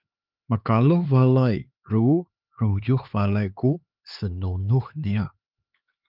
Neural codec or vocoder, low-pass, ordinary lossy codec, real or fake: codec, 16 kHz, 4 kbps, X-Codec, HuBERT features, trained on LibriSpeech; 5.4 kHz; Opus, 32 kbps; fake